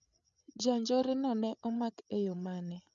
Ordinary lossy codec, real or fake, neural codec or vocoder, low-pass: none; fake; codec, 16 kHz, 8 kbps, FreqCodec, larger model; 7.2 kHz